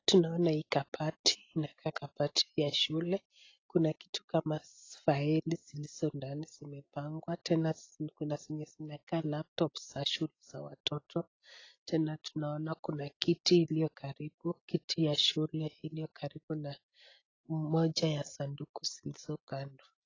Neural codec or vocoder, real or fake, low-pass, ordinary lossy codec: none; real; 7.2 kHz; AAC, 32 kbps